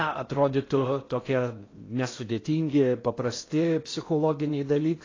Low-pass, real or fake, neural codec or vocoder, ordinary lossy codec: 7.2 kHz; fake; codec, 16 kHz in and 24 kHz out, 0.6 kbps, FocalCodec, streaming, 4096 codes; AAC, 32 kbps